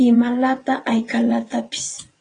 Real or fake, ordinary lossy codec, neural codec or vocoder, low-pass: fake; AAC, 32 kbps; vocoder, 22.05 kHz, 80 mel bands, WaveNeXt; 9.9 kHz